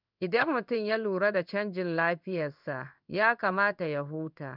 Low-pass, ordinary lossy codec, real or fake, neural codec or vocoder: 5.4 kHz; none; fake; codec, 16 kHz in and 24 kHz out, 1 kbps, XY-Tokenizer